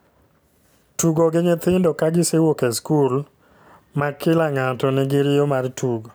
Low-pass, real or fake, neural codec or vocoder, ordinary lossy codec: none; real; none; none